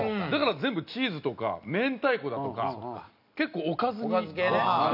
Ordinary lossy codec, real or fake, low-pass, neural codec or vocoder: none; real; 5.4 kHz; none